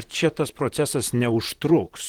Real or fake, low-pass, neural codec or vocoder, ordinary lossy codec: fake; 19.8 kHz; vocoder, 44.1 kHz, 128 mel bands, Pupu-Vocoder; Opus, 16 kbps